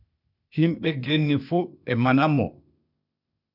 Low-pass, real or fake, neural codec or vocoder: 5.4 kHz; fake; codec, 16 kHz, 0.8 kbps, ZipCodec